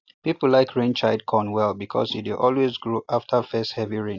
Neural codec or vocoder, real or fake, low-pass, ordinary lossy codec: none; real; 7.2 kHz; AAC, 48 kbps